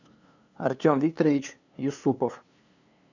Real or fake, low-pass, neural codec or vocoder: fake; 7.2 kHz; codec, 16 kHz, 2 kbps, FunCodec, trained on LibriTTS, 25 frames a second